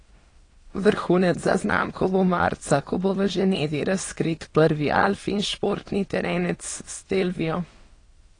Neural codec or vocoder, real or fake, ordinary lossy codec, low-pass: autoencoder, 22.05 kHz, a latent of 192 numbers a frame, VITS, trained on many speakers; fake; AAC, 32 kbps; 9.9 kHz